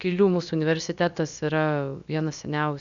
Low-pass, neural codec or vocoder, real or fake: 7.2 kHz; codec, 16 kHz, about 1 kbps, DyCAST, with the encoder's durations; fake